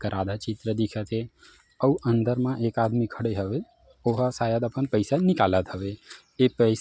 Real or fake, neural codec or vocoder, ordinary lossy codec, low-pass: real; none; none; none